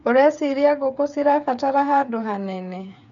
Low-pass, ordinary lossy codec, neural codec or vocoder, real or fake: 7.2 kHz; none; codec, 16 kHz, 8 kbps, FreqCodec, smaller model; fake